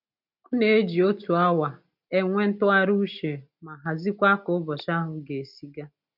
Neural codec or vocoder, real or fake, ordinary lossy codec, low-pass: none; real; none; 5.4 kHz